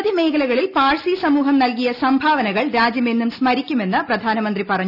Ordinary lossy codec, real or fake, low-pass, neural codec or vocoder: none; real; 5.4 kHz; none